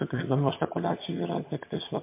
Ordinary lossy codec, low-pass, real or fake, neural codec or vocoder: MP3, 24 kbps; 3.6 kHz; fake; vocoder, 22.05 kHz, 80 mel bands, HiFi-GAN